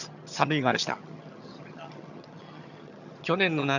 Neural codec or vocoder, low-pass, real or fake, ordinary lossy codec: vocoder, 22.05 kHz, 80 mel bands, HiFi-GAN; 7.2 kHz; fake; none